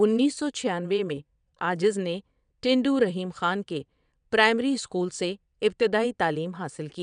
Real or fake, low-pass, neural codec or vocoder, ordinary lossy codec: fake; 9.9 kHz; vocoder, 22.05 kHz, 80 mel bands, WaveNeXt; none